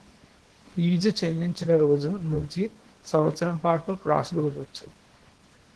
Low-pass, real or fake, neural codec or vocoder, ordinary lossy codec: 10.8 kHz; fake; codec, 24 kHz, 0.9 kbps, WavTokenizer, small release; Opus, 16 kbps